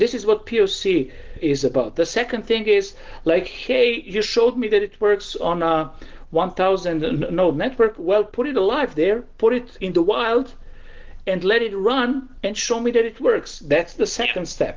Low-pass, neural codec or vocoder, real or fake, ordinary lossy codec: 7.2 kHz; none; real; Opus, 16 kbps